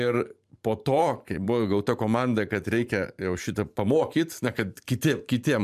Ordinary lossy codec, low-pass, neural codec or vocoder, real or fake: MP3, 96 kbps; 14.4 kHz; vocoder, 44.1 kHz, 128 mel bands every 512 samples, BigVGAN v2; fake